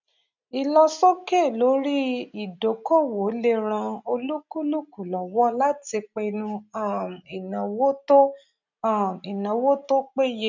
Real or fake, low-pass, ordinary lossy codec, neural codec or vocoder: real; 7.2 kHz; none; none